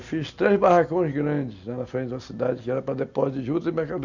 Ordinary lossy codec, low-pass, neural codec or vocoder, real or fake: none; 7.2 kHz; none; real